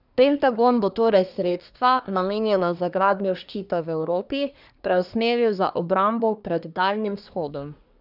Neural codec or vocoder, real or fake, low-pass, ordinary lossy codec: codec, 24 kHz, 1 kbps, SNAC; fake; 5.4 kHz; none